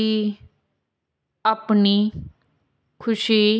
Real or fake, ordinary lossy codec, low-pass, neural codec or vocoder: real; none; none; none